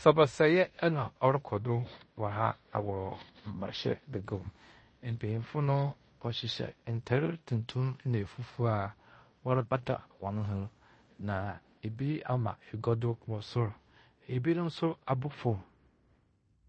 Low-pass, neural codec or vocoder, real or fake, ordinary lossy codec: 9.9 kHz; codec, 16 kHz in and 24 kHz out, 0.9 kbps, LongCat-Audio-Codec, fine tuned four codebook decoder; fake; MP3, 32 kbps